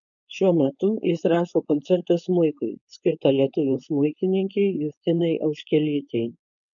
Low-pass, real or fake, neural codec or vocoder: 7.2 kHz; fake; codec, 16 kHz, 4.8 kbps, FACodec